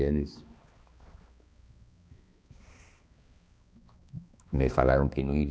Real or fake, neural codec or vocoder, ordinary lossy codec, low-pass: fake; codec, 16 kHz, 2 kbps, X-Codec, HuBERT features, trained on balanced general audio; none; none